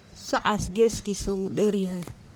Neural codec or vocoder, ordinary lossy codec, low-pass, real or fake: codec, 44.1 kHz, 1.7 kbps, Pupu-Codec; none; none; fake